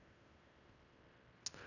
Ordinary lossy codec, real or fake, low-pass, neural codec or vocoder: none; fake; 7.2 kHz; codec, 16 kHz in and 24 kHz out, 0.9 kbps, LongCat-Audio-Codec, fine tuned four codebook decoder